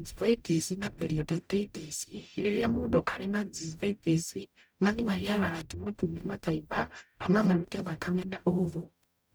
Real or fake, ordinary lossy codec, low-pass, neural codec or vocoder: fake; none; none; codec, 44.1 kHz, 0.9 kbps, DAC